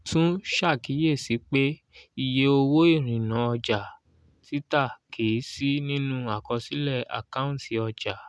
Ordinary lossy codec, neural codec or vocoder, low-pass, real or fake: none; none; none; real